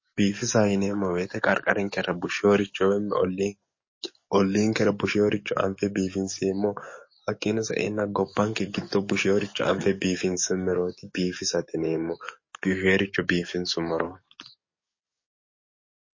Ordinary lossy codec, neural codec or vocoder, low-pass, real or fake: MP3, 32 kbps; codec, 44.1 kHz, 7.8 kbps, DAC; 7.2 kHz; fake